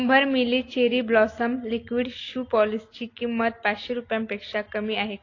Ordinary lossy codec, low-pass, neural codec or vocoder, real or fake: AAC, 32 kbps; 7.2 kHz; none; real